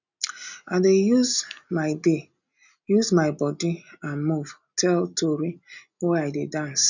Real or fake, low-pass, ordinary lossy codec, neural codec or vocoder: real; 7.2 kHz; none; none